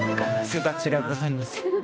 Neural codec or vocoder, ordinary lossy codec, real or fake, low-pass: codec, 16 kHz, 1 kbps, X-Codec, HuBERT features, trained on balanced general audio; none; fake; none